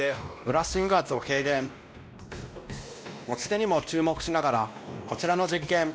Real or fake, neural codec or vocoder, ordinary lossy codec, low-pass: fake; codec, 16 kHz, 1 kbps, X-Codec, WavLM features, trained on Multilingual LibriSpeech; none; none